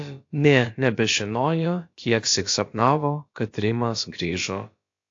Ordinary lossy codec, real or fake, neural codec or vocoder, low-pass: AAC, 48 kbps; fake; codec, 16 kHz, about 1 kbps, DyCAST, with the encoder's durations; 7.2 kHz